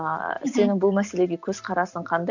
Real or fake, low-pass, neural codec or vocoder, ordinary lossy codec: real; 7.2 kHz; none; none